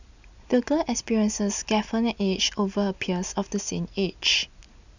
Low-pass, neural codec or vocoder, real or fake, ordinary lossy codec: 7.2 kHz; none; real; none